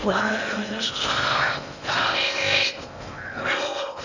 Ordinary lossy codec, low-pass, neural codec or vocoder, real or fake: none; 7.2 kHz; codec, 16 kHz in and 24 kHz out, 0.6 kbps, FocalCodec, streaming, 4096 codes; fake